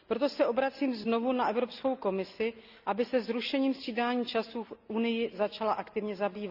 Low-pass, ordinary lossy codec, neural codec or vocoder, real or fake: 5.4 kHz; Opus, 64 kbps; none; real